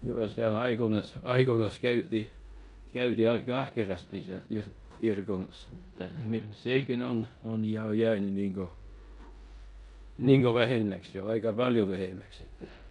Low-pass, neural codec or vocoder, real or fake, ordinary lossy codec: 10.8 kHz; codec, 16 kHz in and 24 kHz out, 0.9 kbps, LongCat-Audio-Codec, four codebook decoder; fake; none